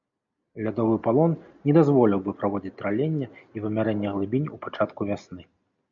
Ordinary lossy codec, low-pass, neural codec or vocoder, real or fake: MP3, 64 kbps; 7.2 kHz; none; real